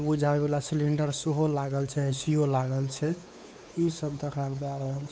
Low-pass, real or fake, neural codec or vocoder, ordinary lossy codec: none; fake; codec, 16 kHz, 4 kbps, X-Codec, WavLM features, trained on Multilingual LibriSpeech; none